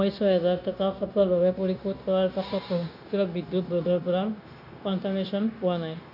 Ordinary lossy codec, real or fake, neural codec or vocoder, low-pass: none; fake; codec, 16 kHz, 0.9 kbps, LongCat-Audio-Codec; 5.4 kHz